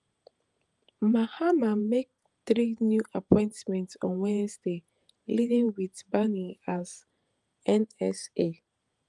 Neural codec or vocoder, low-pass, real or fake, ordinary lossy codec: vocoder, 48 kHz, 128 mel bands, Vocos; 10.8 kHz; fake; Opus, 32 kbps